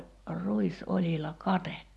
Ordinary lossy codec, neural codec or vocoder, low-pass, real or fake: none; none; none; real